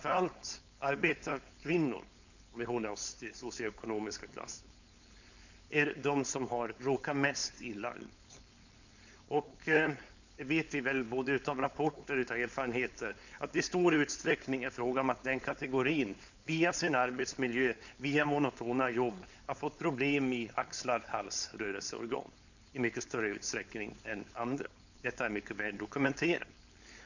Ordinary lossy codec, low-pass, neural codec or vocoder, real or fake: none; 7.2 kHz; codec, 16 kHz, 4.8 kbps, FACodec; fake